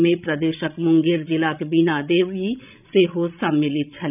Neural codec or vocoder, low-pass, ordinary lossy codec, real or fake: codec, 16 kHz, 16 kbps, FreqCodec, larger model; 3.6 kHz; none; fake